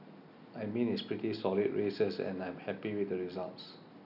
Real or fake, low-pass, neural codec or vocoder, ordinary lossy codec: real; 5.4 kHz; none; none